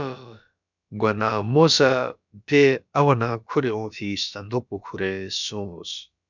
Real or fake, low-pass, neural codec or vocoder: fake; 7.2 kHz; codec, 16 kHz, about 1 kbps, DyCAST, with the encoder's durations